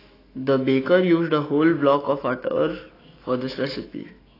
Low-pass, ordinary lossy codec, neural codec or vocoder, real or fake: 5.4 kHz; AAC, 24 kbps; autoencoder, 48 kHz, 128 numbers a frame, DAC-VAE, trained on Japanese speech; fake